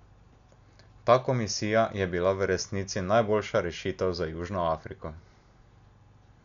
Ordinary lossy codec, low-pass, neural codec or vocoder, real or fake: MP3, 96 kbps; 7.2 kHz; none; real